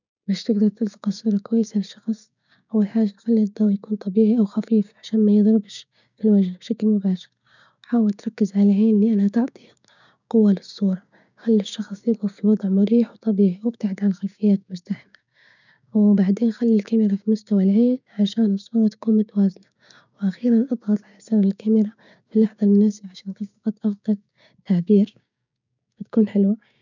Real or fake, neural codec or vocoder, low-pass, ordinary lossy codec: fake; codec, 16 kHz, 6 kbps, DAC; 7.2 kHz; none